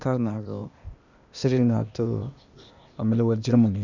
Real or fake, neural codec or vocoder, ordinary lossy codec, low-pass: fake; codec, 16 kHz, 0.8 kbps, ZipCodec; none; 7.2 kHz